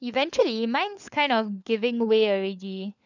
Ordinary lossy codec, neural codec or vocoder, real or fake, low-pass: none; codec, 16 kHz, 4 kbps, FunCodec, trained on LibriTTS, 50 frames a second; fake; 7.2 kHz